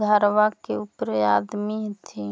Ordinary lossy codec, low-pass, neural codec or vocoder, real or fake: none; none; none; real